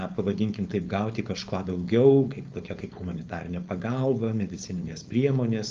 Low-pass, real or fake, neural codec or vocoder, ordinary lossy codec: 7.2 kHz; fake; codec, 16 kHz, 4.8 kbps, FACodec; Opus, 24 kbps